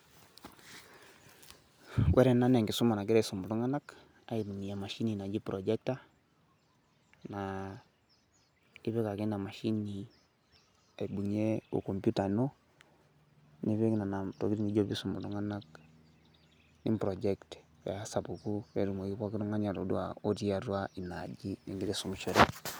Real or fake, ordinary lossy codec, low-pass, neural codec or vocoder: real; none; none; none